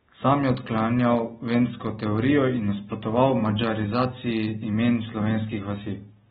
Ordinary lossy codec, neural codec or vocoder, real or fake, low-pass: AAC, 16 kbps; none; real; 19.8 kHz